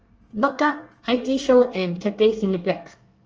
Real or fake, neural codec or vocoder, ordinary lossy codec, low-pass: fake; codec, 24 kHz, 1 kbps, SNAC; Opus, 24 kbps; 7.2 kHz